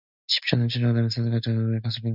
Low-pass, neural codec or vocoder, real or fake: 5.4 kHz; none; real